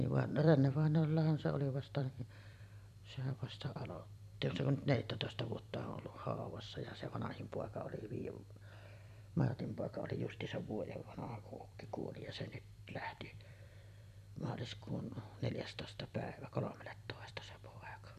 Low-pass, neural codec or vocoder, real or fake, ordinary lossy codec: 14.4 kHz; vocoder, 44.1 kHz, 128 mel bands every 256 samples, BigVGAN v2; fake; none